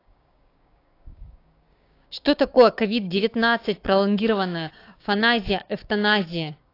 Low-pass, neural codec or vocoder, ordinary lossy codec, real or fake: 5.4 kHz; codec, 16 kHz, 6 kbps, DAC; AAC, 32 kbps; fake